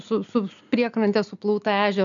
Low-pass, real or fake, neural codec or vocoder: 7.2 kHz; real; none